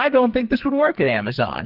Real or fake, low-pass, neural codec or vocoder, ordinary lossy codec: fake; 5.4 kHz; codec, 44.1 kHz, 2.6 kbps, SNAC; Opus, 32 kbps